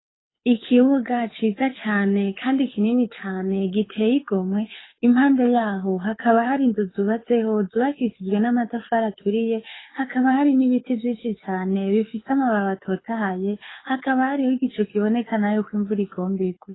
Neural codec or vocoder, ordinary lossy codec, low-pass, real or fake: codec, 24 kHz, 6 kbps, HILCodec; AAC, 16 kbps; 7.2 kHz; fake